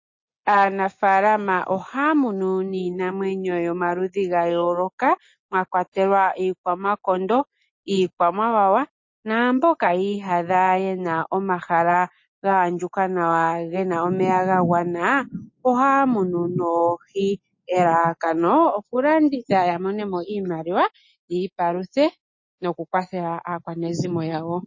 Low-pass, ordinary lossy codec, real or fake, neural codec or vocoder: 7.2 kHz; MP3, 32 kbps; real; none